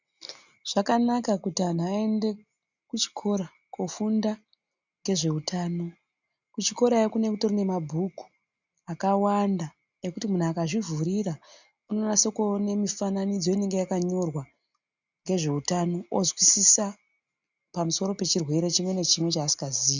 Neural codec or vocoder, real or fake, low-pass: none; real; 7.2 kHz